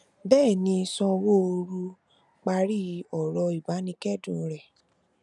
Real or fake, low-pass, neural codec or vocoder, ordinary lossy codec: real; 10.8 kHz; none; none